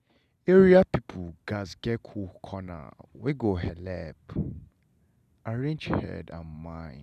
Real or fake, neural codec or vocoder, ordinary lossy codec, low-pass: real; none; none; 14.4 kHz